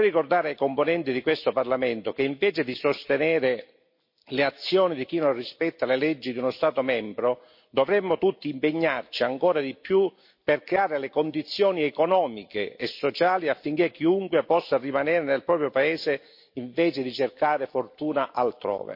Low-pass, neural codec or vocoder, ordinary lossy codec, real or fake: 5.4 kHz; none; MP3, 32 kbps; real